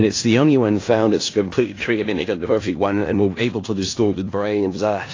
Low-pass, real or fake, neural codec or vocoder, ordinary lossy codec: 7.2 kHz; fake; codec, 16 kHz in and 24 kHz out, 0.4 kbps, LongCat-Audio-Codec, four codebook decoder; AAC, 32 kbps